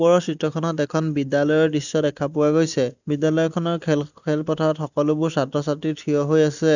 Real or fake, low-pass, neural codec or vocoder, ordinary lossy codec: real; 7.2 kHz; none; none